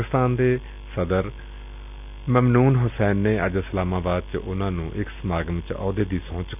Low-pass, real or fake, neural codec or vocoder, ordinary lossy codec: 3.6 kHz; real; none; none